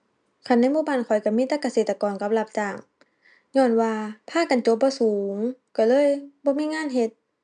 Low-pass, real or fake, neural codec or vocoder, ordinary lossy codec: 9.9 kHz; real; none; none